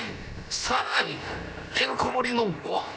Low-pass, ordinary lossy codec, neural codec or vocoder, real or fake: none; none; codec, 16 kHz, about 1 kbps, DyCAST, with the encoder's durations; fake